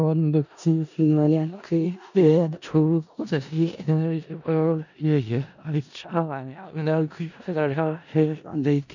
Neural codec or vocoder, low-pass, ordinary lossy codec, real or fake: codec, 16 kHz in and 24 kHz out, 0.4 kbps, LongCat-Audio-Codec, four codebook decoder; 7.2 kHz; none; fake